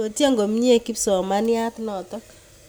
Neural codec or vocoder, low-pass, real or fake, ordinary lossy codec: none; none; real; none